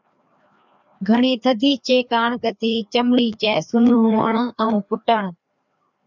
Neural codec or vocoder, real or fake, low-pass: codec, 16 kHz, 2 kbps, FreqCodec, larger model; fake; 7.2 kHz